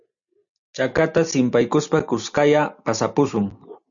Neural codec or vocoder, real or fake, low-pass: none; real; 7.2 kHz